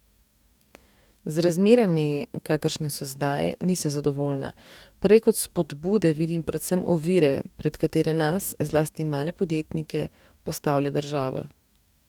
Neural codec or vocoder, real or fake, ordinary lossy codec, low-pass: codec, 44.1 kHz, 2.6 kbps, DAC; fake; none; 19.8 kHz